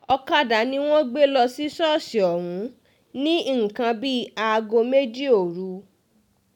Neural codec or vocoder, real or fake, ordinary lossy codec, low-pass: none; real; none; 19.8 kHz